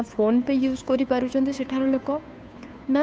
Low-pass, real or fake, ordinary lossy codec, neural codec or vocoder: none; fake; none; codec, 16 kHz, 2 kbps, FunCodec, trained on Chinese and English, 25 frames a second